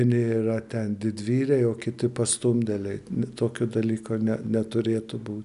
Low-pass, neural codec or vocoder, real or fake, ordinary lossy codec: 10.8 kHz; none; real; AAC, 96 kbps